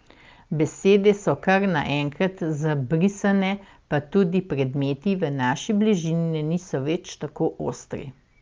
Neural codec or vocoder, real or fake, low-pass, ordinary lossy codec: none; real; 7.2 kHz; Opus, 24 kbps